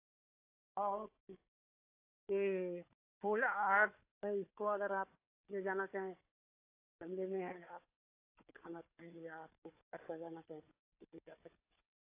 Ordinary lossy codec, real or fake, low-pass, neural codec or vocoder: AAC, 24 kbps; fake; 3.6 kHz; codec, 16 kHz, 4 kbps, FunCodec, trained on Chinese and English, 50 frames a second